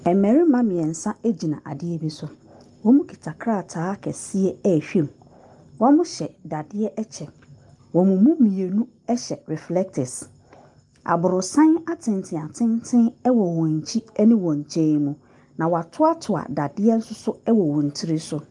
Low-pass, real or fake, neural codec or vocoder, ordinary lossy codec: 10.8 kHz; real; none; Opus, 32 kbps